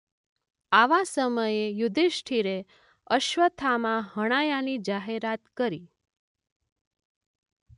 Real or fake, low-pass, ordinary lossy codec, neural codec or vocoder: real; 10.8 kHz; MP3, 96 kbps; none